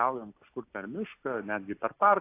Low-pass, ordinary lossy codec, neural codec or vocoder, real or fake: 3.6 kHz; AAC, 24 kbps; none; real